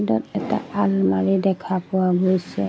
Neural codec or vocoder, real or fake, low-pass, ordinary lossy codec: none; real; none; none